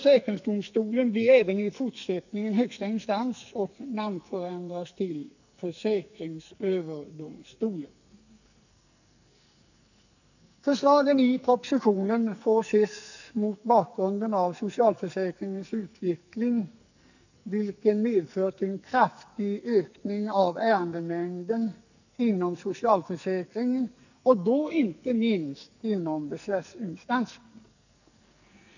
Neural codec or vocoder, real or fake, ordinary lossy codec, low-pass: codec, 44.1 kHz, 2.6 kbps, SNAC; fake; none; 7.2 kHz